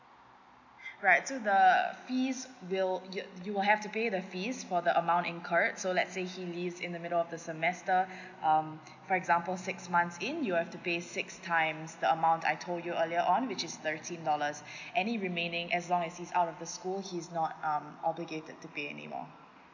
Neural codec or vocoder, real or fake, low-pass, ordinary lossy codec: none; real; 7.2 kHz; none